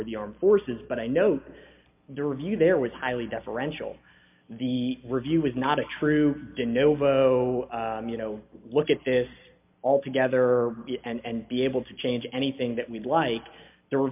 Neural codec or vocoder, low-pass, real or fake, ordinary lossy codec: none; 3.6 kHz; real; MP3, 32 kbps